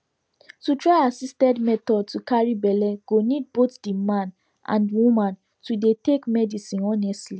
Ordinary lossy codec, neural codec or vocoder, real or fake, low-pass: none; none; real; none